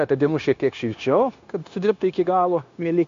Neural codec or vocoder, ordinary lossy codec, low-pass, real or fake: codec, 16 kHz, 0.9 kbps, LongCat-Audio-Codec; MP3, 48 kbps; 7.2 kHz; fake